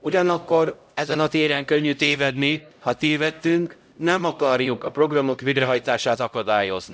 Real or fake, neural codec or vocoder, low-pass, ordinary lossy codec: fake; codec, 16 kHz, 0.5 kbps, X-Codec, HuBERT features, trained on LibriSpeech; none; none